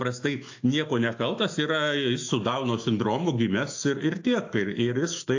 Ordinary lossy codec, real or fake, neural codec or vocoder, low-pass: AAC, 48 kbps; fake; autoencoder, 48 kHz, 128 numbers a frame, DAC-VAE, trained on Japanese speech; 7.2 kHz